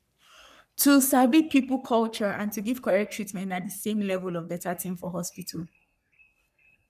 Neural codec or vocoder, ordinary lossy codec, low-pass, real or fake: codec, 44.1 kHz, 3.4 kbps, Pupu-Codec; none; 14.4 kHz; fake